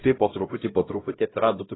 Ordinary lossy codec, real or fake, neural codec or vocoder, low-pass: AAC, 16 kbps; fake; codec, 16 kHz, 0.5 kbps, X-Codec, HuBERT features, trained on LibriSpeech; 7.2 kHz